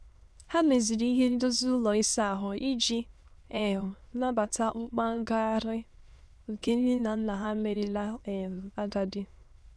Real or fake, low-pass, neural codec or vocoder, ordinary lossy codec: fake; none; autoencoder, 22.05 kHz, a latent of 192 numbers a frame, VITS, trained on many speakers; none